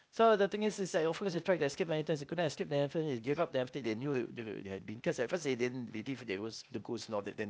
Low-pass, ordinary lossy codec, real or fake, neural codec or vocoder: none; none; fake; codec, 16 kHz, 0.8 kbps, ZipCodec